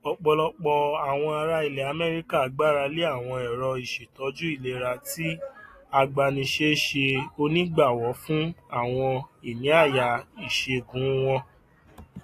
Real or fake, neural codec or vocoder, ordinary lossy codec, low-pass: real; none; AAC, 48 kbps; 14.4 kHz